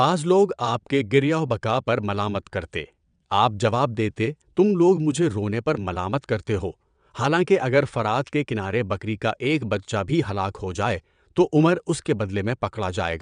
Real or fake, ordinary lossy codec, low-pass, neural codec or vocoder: fake; none; 9.9 kHz; vocoder, 22.05 kHz, 80 mel bands, WaveNeXt